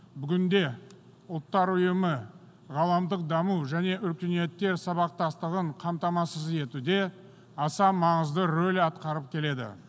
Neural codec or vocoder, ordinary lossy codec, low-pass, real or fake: none; none; none; real